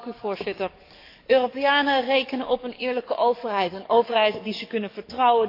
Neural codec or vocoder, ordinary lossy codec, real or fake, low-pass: codec, 44.1 kHz, 7.8 kbps, DAC; AAC, 32 kbps; fake; 5.4 kHz